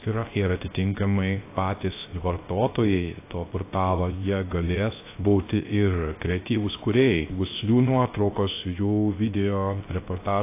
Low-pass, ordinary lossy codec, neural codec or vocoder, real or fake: 3.6 kHz; AAC, 24 kbps; codec, 16 kHz, 0.3 kbps, FocalCodec; fake